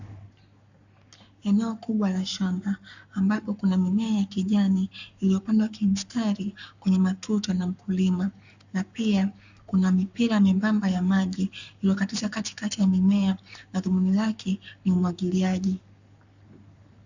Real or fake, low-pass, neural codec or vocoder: fake; 7.2 kHz; codec, 44.1 kHz, 7.8 kbps, Pupu-Codec